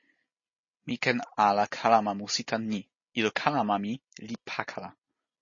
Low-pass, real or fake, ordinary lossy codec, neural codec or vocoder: 7.2 kHz; real; MP3, 32 kbps; none